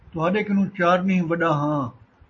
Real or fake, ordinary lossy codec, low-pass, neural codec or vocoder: real; MP3, 32 kbps; 7.2 kHz; none